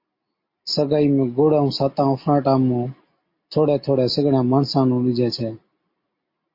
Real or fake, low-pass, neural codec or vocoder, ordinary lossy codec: real; 5.4 kHz; none; MP3, 32 kbps